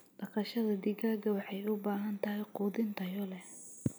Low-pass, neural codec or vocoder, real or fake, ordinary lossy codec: none; none; real; none